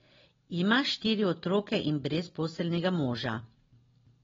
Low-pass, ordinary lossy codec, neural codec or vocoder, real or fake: 7.2 kHz; AAC, 24 kbps; none; real